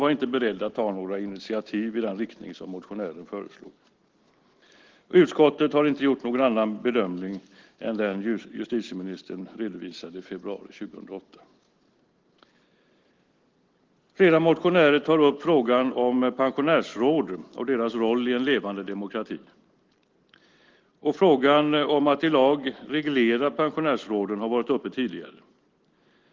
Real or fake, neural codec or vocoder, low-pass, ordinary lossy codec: real; none; 7.2 kHz; Opus, 16 kbps